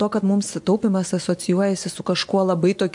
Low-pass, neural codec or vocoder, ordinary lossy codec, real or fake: 10.8 kHz; vocoder, 24 kHz, 100 mel bands, Vocos; MP3, 64 kbps; fake